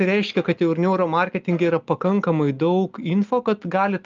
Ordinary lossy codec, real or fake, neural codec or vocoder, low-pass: Opus, 24 kbps; real; none; 7.2 kHz